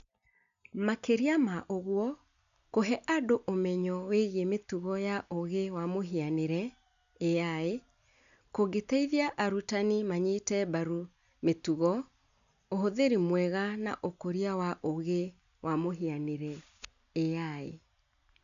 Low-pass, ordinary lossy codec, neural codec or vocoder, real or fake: 7.2 kHz; none; none; real